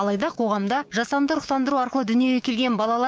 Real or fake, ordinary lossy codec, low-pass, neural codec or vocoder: fake; none; none; codec, 16 kHz, 6 kbps, DAC